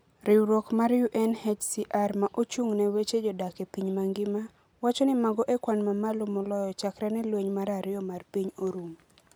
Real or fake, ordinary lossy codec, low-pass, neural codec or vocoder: real; none; none; none